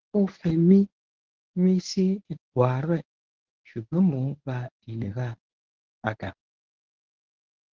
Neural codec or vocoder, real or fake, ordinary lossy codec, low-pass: codec, 24 kHz, 0.9 kbps, WavTokenizer, medium speech release version 1; fake; Opus, 16 kbps; 7.2 kHz